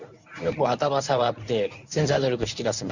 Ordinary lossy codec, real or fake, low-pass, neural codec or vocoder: none; fake; 7.2 kHz; codec, 24 kHz, 0.9 kbps, WavTokenizer, medium speech release version 1